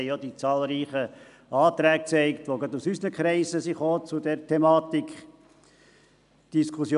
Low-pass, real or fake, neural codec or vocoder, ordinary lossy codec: 10.8 kHz; real; none; none